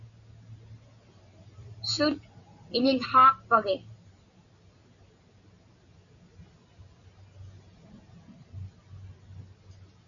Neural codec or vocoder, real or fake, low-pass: none; real; 7.2 kHz